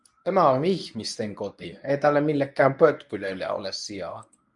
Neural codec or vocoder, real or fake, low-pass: codec, 24 kHz, 0.9 kbps, WavTokenizer, medium speech release version 1; fake; 10.8 kHz